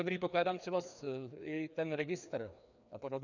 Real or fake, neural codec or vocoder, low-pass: fake; codec, 16 kHz, 2 kbps, FreqCodec, larger model; 7.2 kHz